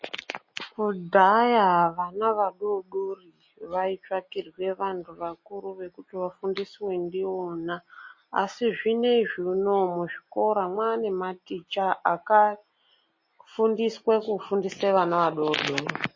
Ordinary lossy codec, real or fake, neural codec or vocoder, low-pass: MP3, 32 kbps; real; none; 7.2 kHz